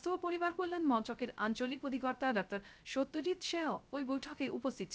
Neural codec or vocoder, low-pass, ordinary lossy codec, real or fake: codec, 16 kHz, 0.3 kbps, FocalCodec; none; none; fake